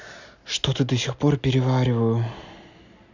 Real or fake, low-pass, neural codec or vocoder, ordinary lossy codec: real; 7.2 kHz; none; none